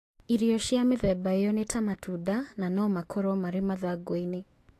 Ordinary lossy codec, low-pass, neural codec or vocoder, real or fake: AAC, 48 kbps; 14.4 kHz; autoencoder, 48 kHz, 128 numbers a frame, DAC-VAE, trained on Japanese speech; fake